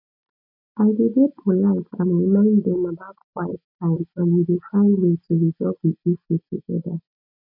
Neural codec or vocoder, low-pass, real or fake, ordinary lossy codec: none; 5.4 kHz; real; MP3, 48 kbps